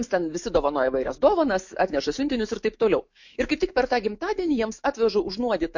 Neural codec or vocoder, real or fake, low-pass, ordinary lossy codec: autoencoder, 48 kHz, 128 numbers a frame, DAC-VAE, trained on Japanese speech; fake; 7.2 kHz; MP3, 48 kbps